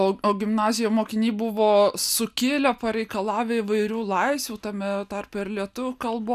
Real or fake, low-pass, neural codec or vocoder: real; 14.4 kHz; none